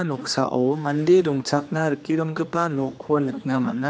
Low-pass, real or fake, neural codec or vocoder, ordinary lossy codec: none; fake; codec, 16 kHz, 2 kbps, X-Codec, HuBERT features, trained on general audio; none